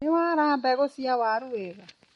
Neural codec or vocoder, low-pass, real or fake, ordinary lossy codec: none; 14.4 kHz; real; MP3, 48 kbps